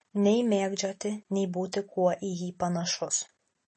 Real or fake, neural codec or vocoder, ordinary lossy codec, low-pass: real; none; MP3, 32 kbps; 10.8 kHz